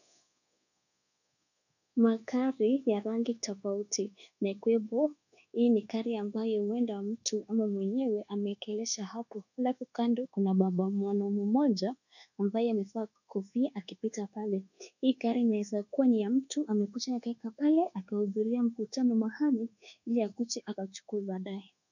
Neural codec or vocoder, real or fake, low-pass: codec, 24 kHz, 1.2 kbps, DualCodec; fake; 7.2 kHz